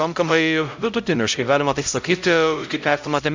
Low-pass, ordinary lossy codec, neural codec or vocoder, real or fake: 7.2 kHz; MP3, 64 kbps; codec, 16 kHz, 0.5 kbps, X-Codec, HuBERT features, trained on LibriSpeech; fake